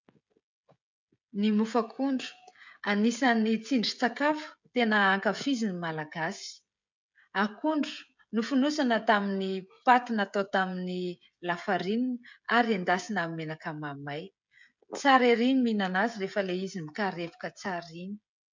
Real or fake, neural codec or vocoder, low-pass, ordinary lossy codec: fake; codec, 16 kHz, 16 kbps, FreqCodec, smaller model; 7.2 kHz; MP3, 64 kbps